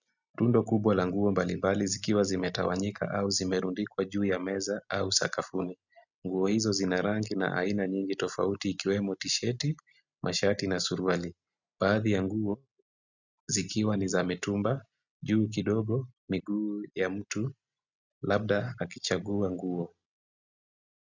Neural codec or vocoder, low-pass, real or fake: none; 7.2 kHz; real